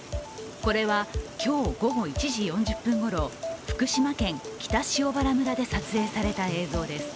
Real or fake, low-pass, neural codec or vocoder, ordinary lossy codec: real; none; none; none